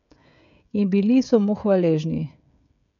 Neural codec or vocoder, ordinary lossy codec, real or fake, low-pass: codec, 16 kHz, 16 kbps, FreqCodec, smaller model; none; fake; 7.2 kHz